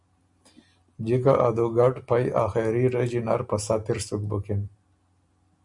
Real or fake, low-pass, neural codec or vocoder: real; 10.8 kHz; none